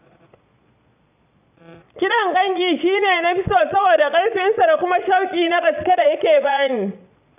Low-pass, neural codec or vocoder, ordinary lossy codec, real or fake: 3.6 kHz; vocoder, 22.05 kHz, 80 mel bands, Vocos; none; fake